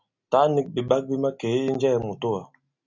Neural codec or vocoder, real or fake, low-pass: none; real; 7.2 kHz